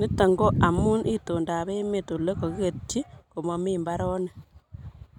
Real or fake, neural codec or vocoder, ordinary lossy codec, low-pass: real; none; none; 19.8 kHz